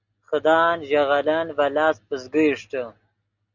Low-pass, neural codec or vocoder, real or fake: 7.2 kHz; none; real